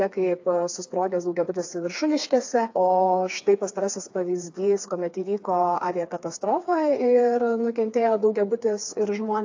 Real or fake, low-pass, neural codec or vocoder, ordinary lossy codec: fake; 7.2 kHz; codec, 16 kHz, 4 kbps, FreqCodec, smaller model; AAC, 48 kbps